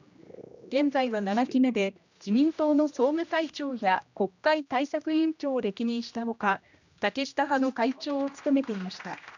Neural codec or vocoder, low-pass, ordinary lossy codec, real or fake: codec, 16 kHz, 1 kbps, X-Codec, HuBERT features, trained on general audio; 7.2 kHz; none; fake